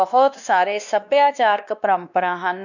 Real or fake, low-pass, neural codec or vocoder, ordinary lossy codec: fake; 7.2 kHz; codec, 16 kHz, 2 kbps, X-Codec, WavLM features, trained on Multilingual LibriSpeech; none